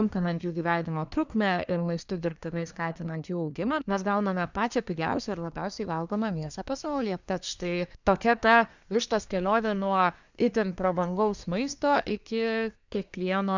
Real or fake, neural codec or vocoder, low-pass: fake; codec, 24 kHz, 1 kbps, SNAC; 7.2 kHz